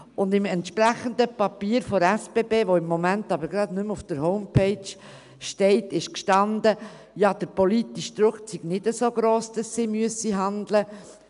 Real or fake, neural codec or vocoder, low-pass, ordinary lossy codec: real; none; 10.8 kHz; none